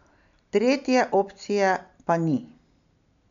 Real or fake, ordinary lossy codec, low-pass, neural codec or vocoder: real; none; 7.2 kHz; none